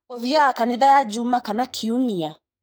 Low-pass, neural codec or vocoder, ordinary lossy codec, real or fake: none; codec, 44.1 kHz, 2.6 kbps, SNAC; none; fake